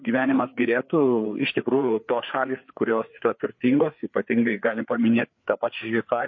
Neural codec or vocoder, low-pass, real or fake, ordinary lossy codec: codec, 16 kHz, 4 kbps, FreqCodec, larger model; 7.2 kHz; fake; MP3, 32 kbps